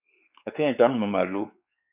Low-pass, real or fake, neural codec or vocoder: 3.6 kHz; fake; codec, 16 kHz, 4 kbps, X-Codec, WavLM features, trained on Multilingual LibriSpeech